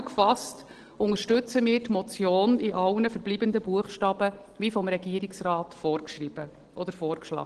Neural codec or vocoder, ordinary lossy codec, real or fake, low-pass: none; Opus, 16 kbps; real; 9.9 kHz